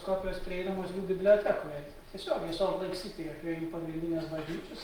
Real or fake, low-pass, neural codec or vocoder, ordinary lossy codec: real; 19.8 kHz; none; Opus, 32 kbps